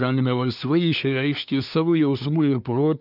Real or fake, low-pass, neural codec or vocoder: fake; 5.4 kHz; codec, 24 kHz, 1 kbps, SNAC